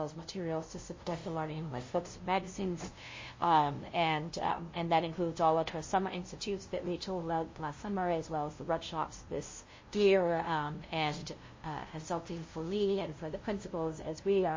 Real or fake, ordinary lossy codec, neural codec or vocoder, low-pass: fake; MP3, 32 kbps; codec, 16 kHz, 0.5 kbps, FunCodec, trained on LibriTTS, 25 frames a second; 7.2 kHz